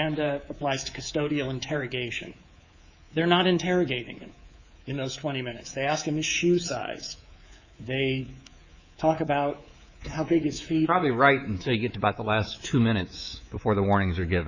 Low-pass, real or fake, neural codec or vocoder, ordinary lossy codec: 7.2 kHz; fake; codec, 24 kHz, 3.1 kbps, DualCodec; Opus, 64 kbps